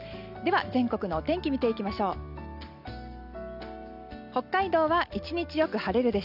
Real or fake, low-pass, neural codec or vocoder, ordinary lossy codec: real; 5.4 kHz; none; none